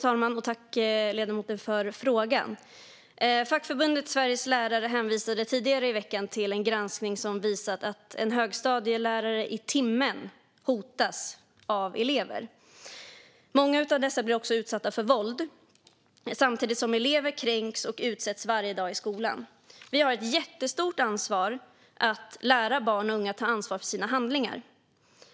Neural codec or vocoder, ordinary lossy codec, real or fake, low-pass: none; none; real; none